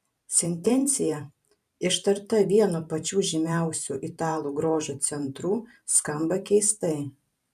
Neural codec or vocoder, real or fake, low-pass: vocoder, 44.1 kHz, 128 mel bands every 512 samples, BigVGAN v2; fake; 14.4 kHz